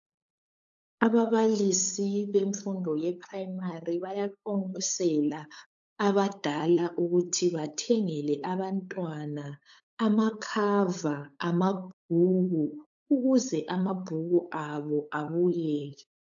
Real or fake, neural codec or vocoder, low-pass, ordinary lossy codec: fake; codec, 16 kHz, 8 kbps, FunCodec, trained on LibriTTS, 25 frames a second; 7.2 kHz; AAC, 64 kbps